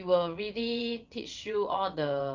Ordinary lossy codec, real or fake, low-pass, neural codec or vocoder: Opus, 24 kbps; fake; 7.2 kHz; vocoder, 22.05 kHz, 80 mel bands, WaveNeXt